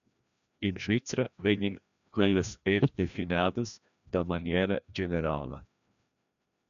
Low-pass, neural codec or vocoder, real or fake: 7.2 kHz; codec, 16 kHz, 1 kbps, FreqCodec, larger model; fake